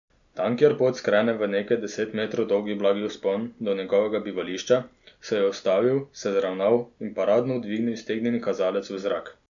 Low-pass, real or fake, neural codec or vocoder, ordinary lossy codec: 7.2 kHz; real; none; none